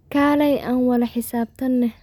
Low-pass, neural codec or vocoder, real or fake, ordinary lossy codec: 19.8 kHz; none; real; none